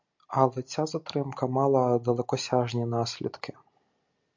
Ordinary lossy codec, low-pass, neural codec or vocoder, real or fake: MP3, 64 kbps; 7.2 kHz; none; real